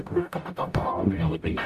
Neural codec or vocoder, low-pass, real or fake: codec, 44.1 kHz, 0.9 kbps, DAC; 14.4 kHz; fake